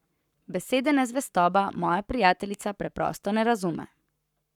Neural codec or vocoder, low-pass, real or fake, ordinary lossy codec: codec, 44.1 kHz, 7.8 kbps, Pupu-Codec; 19.8 kHz; fake; none